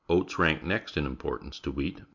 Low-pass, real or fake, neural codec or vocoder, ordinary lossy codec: 7.2 kHz; real; none; MP3, 64 kbps